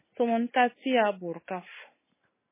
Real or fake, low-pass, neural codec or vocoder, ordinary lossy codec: real; 3.6 kHz; none; MP3, 16 kbps